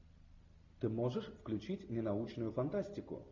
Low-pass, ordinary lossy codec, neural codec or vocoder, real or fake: 7.2 kHz; MP3, 64 kbps; none; real